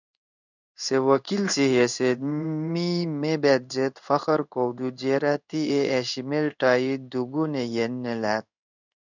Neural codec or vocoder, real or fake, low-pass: codec, 16 kHz in and 24 kHz out, 1 kbps, XY-Tokenizer; fake; 7.2 kHz